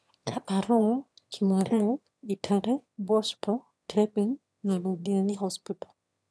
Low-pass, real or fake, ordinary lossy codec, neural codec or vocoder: none; fake; none; autoencoder, 22.05 kHz, a latent of 192 numbers a frame, VITS, trained on one speaker